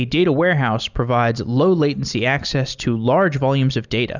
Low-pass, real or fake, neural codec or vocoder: 7.2 kHz; real; none